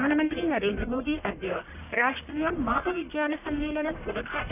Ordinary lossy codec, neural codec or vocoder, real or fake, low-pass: none; codec, 44.1 kHz, 1.7 kbps, Pupu-Codec; fake; 3.6 kHz